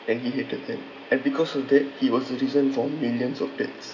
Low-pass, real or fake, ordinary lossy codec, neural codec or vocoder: 7.2 kHz; real; none; none